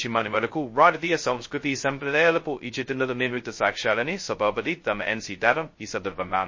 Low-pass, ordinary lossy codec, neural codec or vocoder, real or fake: 7.2 kHz; MP3, 32 kbps; codec, 16 kHz, 0.2 kbps, FocalCodec; fake